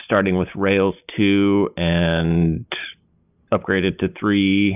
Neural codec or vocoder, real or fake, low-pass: none; real; 3.6 kHz